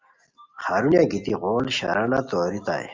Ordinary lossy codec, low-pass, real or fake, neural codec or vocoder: Opus, 32 kbps; 7.2 kHz; real; none